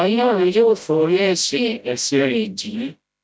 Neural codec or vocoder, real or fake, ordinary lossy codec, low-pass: codec, 16 kHz, 0.5 kbps, FreqCodec, smaller model; fake; none; none